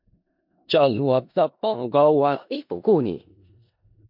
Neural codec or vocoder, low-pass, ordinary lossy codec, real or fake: codec, 16 kHz in and 24 kHz out, 0.4 kbps, LongCat-Audio-Codec, four codebook decoder; 5.4 kHz; MP3, 48 kbps; fake